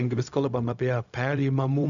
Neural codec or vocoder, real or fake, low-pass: codec, 16 kHz, 0.4 kbps, LongCat-Audio-Codec; fake; 7.2 kHz